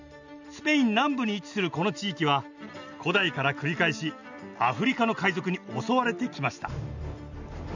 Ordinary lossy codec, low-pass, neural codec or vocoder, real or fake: none; 7.2 kHz; none; real